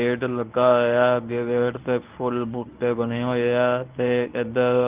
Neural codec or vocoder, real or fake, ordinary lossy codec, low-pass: codec, 24 kHz, 0.9 kbps, WavTokenizer, medium speech release version 1; fake; Opus, 32 kbps; 3.6 kHz